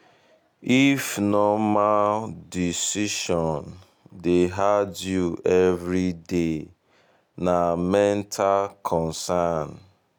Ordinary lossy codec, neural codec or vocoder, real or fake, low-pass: none; none; real; none